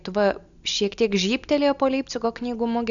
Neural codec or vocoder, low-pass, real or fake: none; 7.2 kHz; real